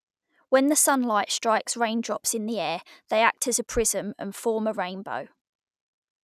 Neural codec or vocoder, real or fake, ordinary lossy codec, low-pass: none; real; none; 14.4 kHz